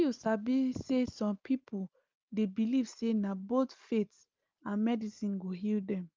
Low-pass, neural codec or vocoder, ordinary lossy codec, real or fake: 7.2 kHz; none; Opus, 24 kbps; real